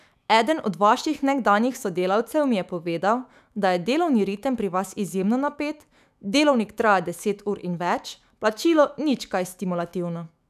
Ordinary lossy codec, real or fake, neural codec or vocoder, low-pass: none; fake; autoencoder, 48 kHz, 128 numbers a frame, DAC-VAE, trained on Japanese speech; 14.4 kHz